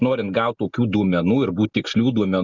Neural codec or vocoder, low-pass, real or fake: none; 7.2 kHz; real